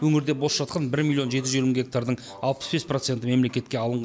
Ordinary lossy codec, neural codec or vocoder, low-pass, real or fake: none; none; none; real